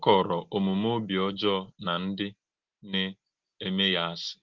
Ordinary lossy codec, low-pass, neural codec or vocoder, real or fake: Opus, 16 kbps; 7.2 kHz; none; real